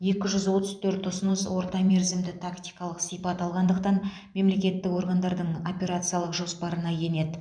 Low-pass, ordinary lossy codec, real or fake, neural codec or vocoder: 9.9 kHz; none; fake; vocoder, 44.1 kHz, 128 mel bands every 512 samples, BigVGAN v2